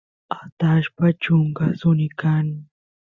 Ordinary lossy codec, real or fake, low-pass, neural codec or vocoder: Opus, 64 kbps; real; 7.2 kHz; none